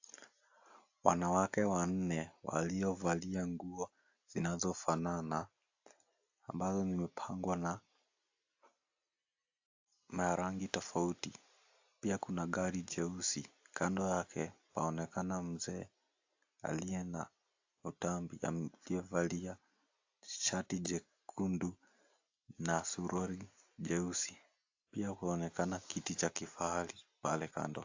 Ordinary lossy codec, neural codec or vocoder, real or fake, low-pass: AAC, 48 kbps; none; real; 7.2 kHz